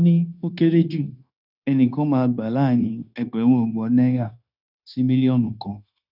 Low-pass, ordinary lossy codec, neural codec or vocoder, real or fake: 5.4 kHz; none; codec, 16 kHz, 0.9 kbps, LongCat-Audio-Codec; fake